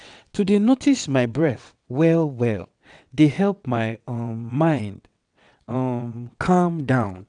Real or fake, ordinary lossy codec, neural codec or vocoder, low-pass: fake; none; vocoder, 22.05 kHz, 80 mel bands, WaveNeXt; 9.9 kHz